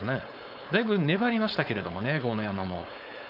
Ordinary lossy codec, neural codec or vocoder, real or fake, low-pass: none; codec, 16 kHz, 4.8 kbps, FACodec; fake; 5.4 kHz